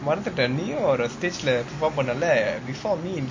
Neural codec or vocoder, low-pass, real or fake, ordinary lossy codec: none; 7.2 kHz; real; MP3, 32 kbps